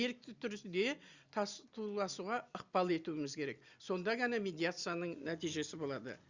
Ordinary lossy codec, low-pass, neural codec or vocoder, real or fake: Opus, 64 kbps; 7.2 kHz; none; real